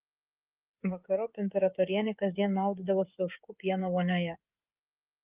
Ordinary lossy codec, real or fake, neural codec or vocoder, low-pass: Opus, 24 kbps; fake; codec, 16 kHz, 16 kbps, FreqCodec, smaller model; 3.6 kHz